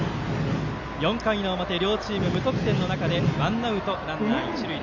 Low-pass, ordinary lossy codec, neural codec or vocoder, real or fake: 7.2 kHz; none; none; real